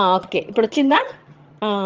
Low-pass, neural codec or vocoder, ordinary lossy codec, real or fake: 7.2 kHz; vocoder, 22.05 kHz, 80 mel bands, HiFi-GAN; Opus, 24 kbps; fake